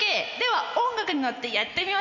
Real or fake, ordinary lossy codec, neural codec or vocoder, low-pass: real; none; none; 7.2 kHz